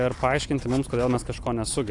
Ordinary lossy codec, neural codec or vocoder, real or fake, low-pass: AAC, 64 kbps; none; real; 10.8 kHz